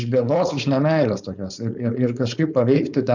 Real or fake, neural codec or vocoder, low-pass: fake; codec, 16 kHz, 4.8 kbps, FACodec; 7.2 kHz